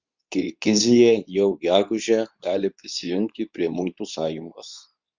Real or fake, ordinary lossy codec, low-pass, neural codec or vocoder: fake; Opus, 64 kbps; 7.2 kHz; codec, 24 kHz, 0.9 kbps, WavTokenizer, medium speech release version 2